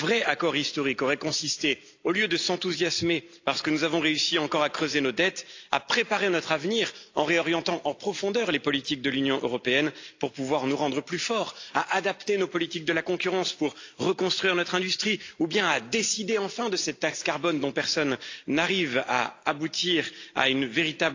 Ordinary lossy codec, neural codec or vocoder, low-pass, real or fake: AAC, 48 kbps; none; 7.2 kHz; real